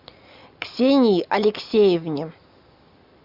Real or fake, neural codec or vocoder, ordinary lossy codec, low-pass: real; none; MP3, 48 kbps; 5.4 kHz